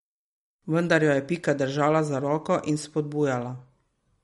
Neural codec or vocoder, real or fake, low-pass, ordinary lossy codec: none; real; 19.8 kHz; MP3, 48 kbps